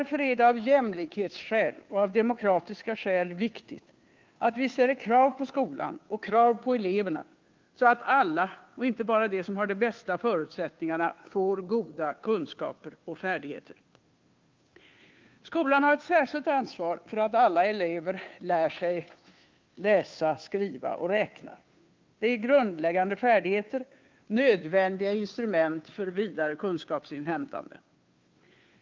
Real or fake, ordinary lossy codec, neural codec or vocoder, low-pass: fake; Opus, 32 kbps; codec, 16 kHz, 2 kbps, FunCodec, trained on Chinese and English, 25 frames a second; 7.2 kHz